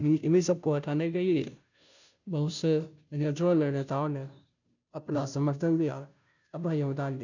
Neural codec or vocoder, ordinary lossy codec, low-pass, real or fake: codec, 16 kHz, 0.5 kbps, FunCodec, trained on Chinese and English, 25 frames a second; none; 7.2 kHz; fake